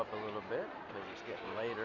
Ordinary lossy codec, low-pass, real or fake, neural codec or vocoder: Opus, 64 kbps; 7.2 kHz; real; none